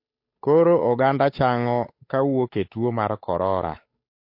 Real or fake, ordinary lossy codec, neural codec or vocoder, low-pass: fake; MP3, 32 kbps; codec, 16 kHz, 8 kbps, FunCodec, trained on Chinese and English, 25 frames a second; 5.4 kHz